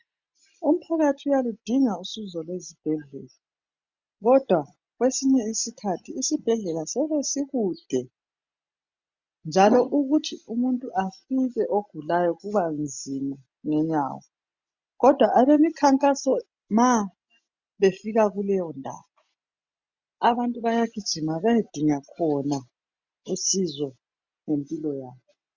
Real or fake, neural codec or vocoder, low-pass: real; none; 7.2 kHz